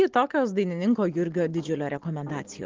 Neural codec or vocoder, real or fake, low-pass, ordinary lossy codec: none; real; 7.2 kHz; Opus, 16 kbps